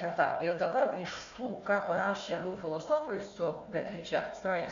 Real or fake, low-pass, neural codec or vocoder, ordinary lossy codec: fake; 7.2 kHz; codec, 16 kHz, 1 kbps, FunCodec, trained on Chinese and English, 50 frames a second; MP3, 64 kbps